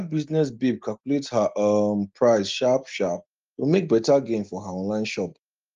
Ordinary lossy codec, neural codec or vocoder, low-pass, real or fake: Opus, 32 kbps; none; 7.2 kHz; real